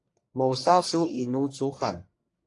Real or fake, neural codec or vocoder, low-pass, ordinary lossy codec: fake; codec, 44.1 kHz, 1.7 kbps, Pupu-Codec; 10.8 kHz; AAC, 48 kbps